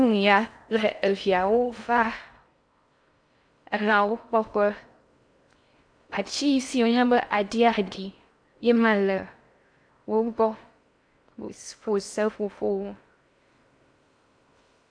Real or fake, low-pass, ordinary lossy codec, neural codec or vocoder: fake; 9.9 kHz; AAC, 64 kbps; codec, 16 kHz in and 24 kHz out, 0.6 kbps, FocalCodec, streaming, 4096 codes